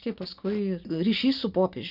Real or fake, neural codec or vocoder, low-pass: real; none; 5.4 kHz